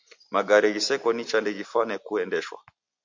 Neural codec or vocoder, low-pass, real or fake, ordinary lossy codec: none; 7.2 kHz; real; MP3, 48 kbps